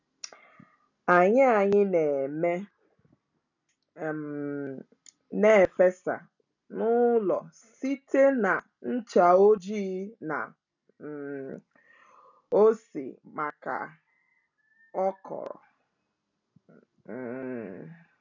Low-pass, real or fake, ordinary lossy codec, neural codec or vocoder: 7.2 kHz; real; none; none